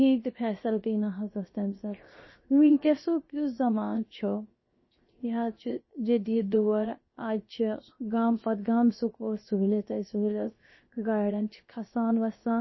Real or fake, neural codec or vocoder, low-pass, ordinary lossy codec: fake; codec, 16 kHz, 0.7 kbps, FocalCodec; 7.2 kHz; MP3, 24 kbps